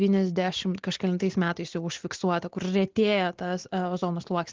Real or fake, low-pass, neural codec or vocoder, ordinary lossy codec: real; 7.2 kHz; none; Opus, 16 kbps